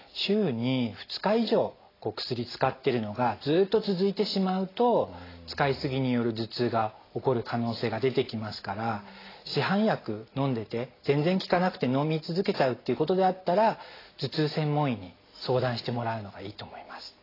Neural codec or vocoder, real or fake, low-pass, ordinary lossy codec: none; real; 5.4 kHz; AAC, 24 kbps